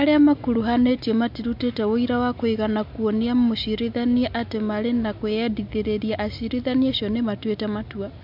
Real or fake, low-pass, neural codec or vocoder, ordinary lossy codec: real; 5.4 kHz; none; MP3, 48 kbps